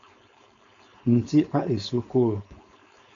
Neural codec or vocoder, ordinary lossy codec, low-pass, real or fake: codec, 16 kHz, 4.8 kbps, FACodec; MP3, 64 kbps; 7.2 kHz; fake